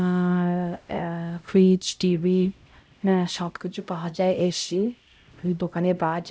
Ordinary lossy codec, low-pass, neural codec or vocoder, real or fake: none; none; codec, 16 kHz, 0.5 kbps, X-Codec, HuBERT features, trained on LibriSpeech; fake